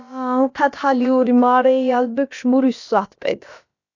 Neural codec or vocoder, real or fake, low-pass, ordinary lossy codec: codec, 16 kHz, about 1 kbps, DyCAST, with the encoder's durations; fake; 7.2 kHz; AAC, 48 kbps